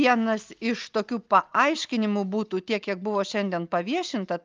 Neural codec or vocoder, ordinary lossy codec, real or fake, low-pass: none; Opus, 24 kbps; real; 7.2 kHz